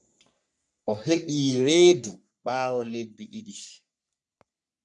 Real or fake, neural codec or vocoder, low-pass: fake; codec, 44.1 kHz, 3.4 kbps, Pupu-Codec; 10.8 kHz